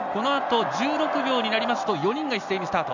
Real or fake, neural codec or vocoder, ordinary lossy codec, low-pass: real; none; none; 7.2 kHz